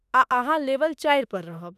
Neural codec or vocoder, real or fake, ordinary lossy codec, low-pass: autoencoder, 48 kHz, 32 numbers a frame, DAC-VAE, trained on Japanese speech; fake; none; 14.4 kHz